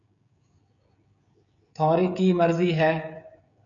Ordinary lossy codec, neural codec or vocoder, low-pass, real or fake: MP3, 64 kbps; codec, 16 kHz, 16 kbps, FreqCodec, smaller model; 7.2 kHz; fake